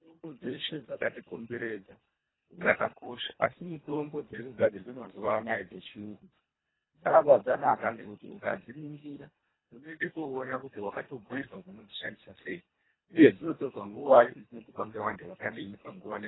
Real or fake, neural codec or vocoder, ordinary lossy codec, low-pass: fake; codec, 24 kHz, 1.5 kbps, HILCodec; AAC, 16 kbps; 7.2 kHz